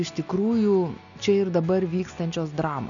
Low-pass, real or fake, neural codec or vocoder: 7.2 kHz; real; none